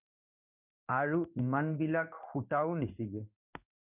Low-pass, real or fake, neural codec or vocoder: 3.6 kHz; fake; codec, 16 kHz in and 24 kHz out, 1 kbps, XY-Tokenizer